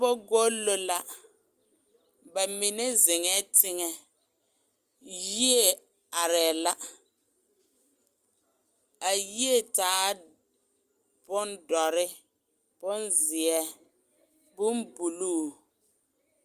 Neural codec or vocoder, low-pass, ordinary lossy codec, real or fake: none; 14.4 kHz; Opus, 32 kbps; real